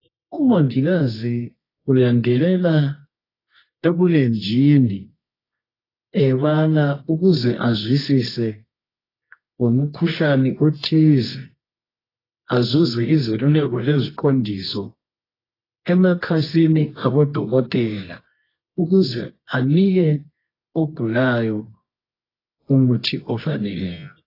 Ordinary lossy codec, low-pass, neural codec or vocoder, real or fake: AAC, 24 kbps; 5.4 kHz; codec, 24 kHz, 0.9 kbps, WavTokenizer, medium music audio release; fake